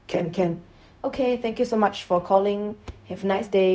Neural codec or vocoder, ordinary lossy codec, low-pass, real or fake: codec, 16 kHz, 0.4 kbps, LongCat-Audio-Codec; none; none; fake